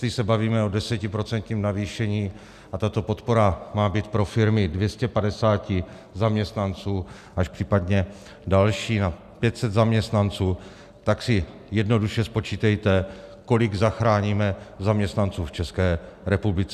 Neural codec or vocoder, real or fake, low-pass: none; real; 14.4 kHz